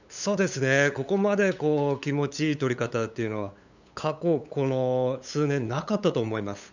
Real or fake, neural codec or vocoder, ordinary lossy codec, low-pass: fake; codec, 16 kHz, 8 kbps, FunCodec, trained on LibriTTS, 25 frames a second; none; 7.2 kHz